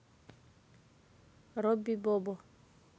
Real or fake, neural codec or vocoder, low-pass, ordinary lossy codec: real; none; none; none